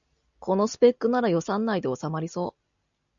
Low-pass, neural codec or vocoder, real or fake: 7.2 kHz; none; real